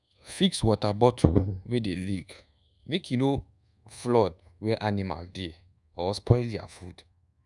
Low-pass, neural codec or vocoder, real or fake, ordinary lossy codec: 10.8 kHz; codec, 24 kHz, 1.2 kbps, DualCodec; fake; none